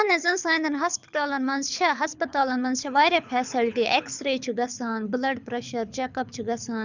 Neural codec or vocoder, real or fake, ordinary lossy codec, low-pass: codec, 24 kHz, 6 kbps, HILCodec; fake; none; 7.2 kHz